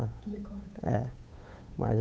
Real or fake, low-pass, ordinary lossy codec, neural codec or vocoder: fake; none; none; codec, 16 kHz, 8 kbps, FunCodec, trained on Chinese and English, 25 frames a second